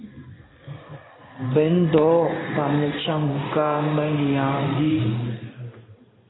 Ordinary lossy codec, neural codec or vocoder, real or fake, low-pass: AAC, 16 kbps; codec, 16 kHz in and 24 kHz out, 1 kbps, XY-Tokenizer; fake; 7.2 kHz